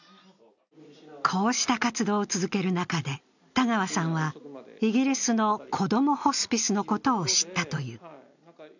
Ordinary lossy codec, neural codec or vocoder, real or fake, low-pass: none; none; real; 7.2 kHz